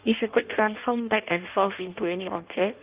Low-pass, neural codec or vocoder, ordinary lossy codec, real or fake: 3.6 kHz; codec, 16 kHz in and 24 kHz out, 0.6 kbps, FireRedTTS-2 codec; Opus, 24 kbps; fake